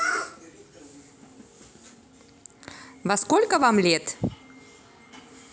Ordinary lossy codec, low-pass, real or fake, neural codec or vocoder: none; none; real; none